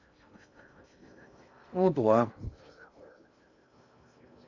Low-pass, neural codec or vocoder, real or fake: 7.2 kHz; codec, 16 kHz in and 24 kHz out, 0.6 kbps, FocalCodec, streaming, 2048 codes; fake